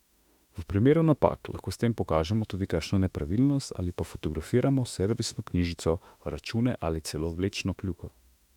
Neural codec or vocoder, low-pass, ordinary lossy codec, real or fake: autoencoder, 48 kHz, 32 numbers a frame, DAC-VAE, trained on Japanese speech; 19.8 kHz; none; fake